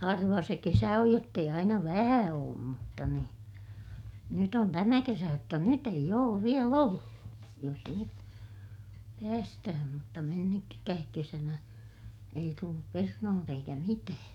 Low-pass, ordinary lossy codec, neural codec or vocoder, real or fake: 19.8 kHz; none; codec, 44.1 kHz, 7.8 kbps, DAC; fake